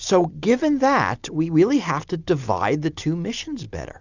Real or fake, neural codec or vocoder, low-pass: real; none; 7.2 kHz